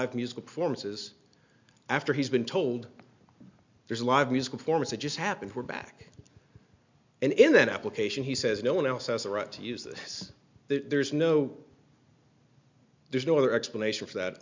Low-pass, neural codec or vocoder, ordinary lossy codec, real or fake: 7.2 kHz; none; MP3, 64 kbps; real